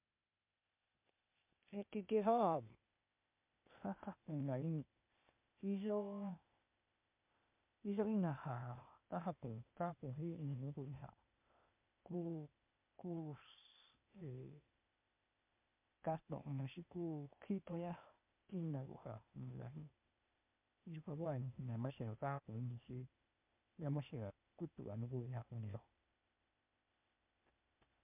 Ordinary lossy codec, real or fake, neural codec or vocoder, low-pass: MP3, 32 kbps; fake; codec, 16 kHz, 0.8 kbps, ZipCodec; 3.6 kHz